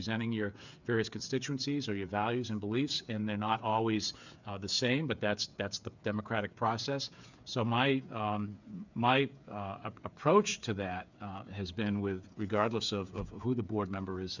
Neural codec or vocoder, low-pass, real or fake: codec, 16 kHz, 8 kbps, FreqCodec, smaller model; 7.2 kHz; fake